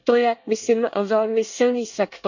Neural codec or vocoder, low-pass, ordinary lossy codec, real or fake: codec, 24 kHz, 1 kbps, SNAC; 7.2 kHz; none; fake